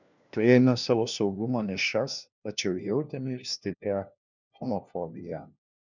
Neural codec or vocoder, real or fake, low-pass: codec, 16 kHz, 1 kbps, FunCodec, trained on LibriTTS, 50 frames a second; fake; 7.2 kHz